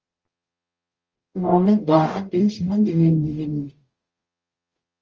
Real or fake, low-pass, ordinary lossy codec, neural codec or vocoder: fake; 7.2 kHz; Opus, 24 kbps; codec, 44.1 kHz, 0.9 kbps, DAC